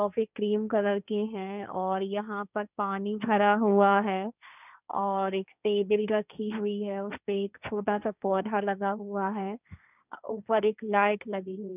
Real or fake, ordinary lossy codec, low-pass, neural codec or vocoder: fake; none; 3.6 kHz; codec, 16 kHz, 1.1 kbps, Voila-Tokenizer